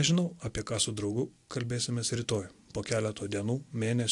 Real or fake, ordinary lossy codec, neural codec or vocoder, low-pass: real; AAC, 64 kbps; none; 10.8 kHz